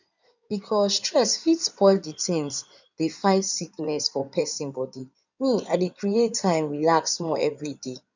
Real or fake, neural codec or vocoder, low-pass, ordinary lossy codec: fake; codec, 16 kHz in and 24 kHz out, 2.2 kbps, FireRedTTS-2 codec; 7.2 kHz; none